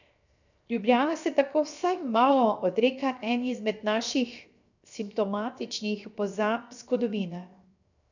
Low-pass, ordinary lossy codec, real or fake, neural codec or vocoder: 7.2 kHz; none; fake; codec, 16 kHz, 0.7 kbps, FocalCodec